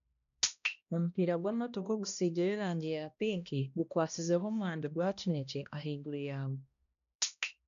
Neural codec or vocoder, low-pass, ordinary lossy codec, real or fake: codec, 16 kHz, 1 kbps, X-Codec, HuBERT features, trained on balanced general audio; 7.2 kHz; none; fake